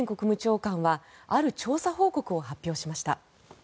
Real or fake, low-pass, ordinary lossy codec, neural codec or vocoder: real; none; none; none